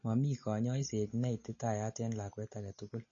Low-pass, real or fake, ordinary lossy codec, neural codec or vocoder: 7.2 kHz; real; MP3, 32 kbps; none